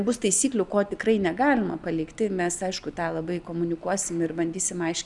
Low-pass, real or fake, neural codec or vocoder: 10.8 kHz; real; none